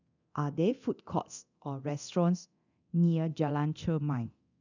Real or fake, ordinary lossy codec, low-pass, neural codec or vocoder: fake; none; 7.2 kHz; codec, 24 kHz, 0.9 kbps, DualCodec